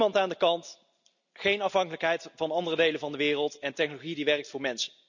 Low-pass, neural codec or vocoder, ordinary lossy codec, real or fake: 7.2 kHz; none; none; real